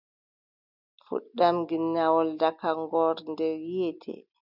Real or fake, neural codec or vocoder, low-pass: real; none; 5.4 kHz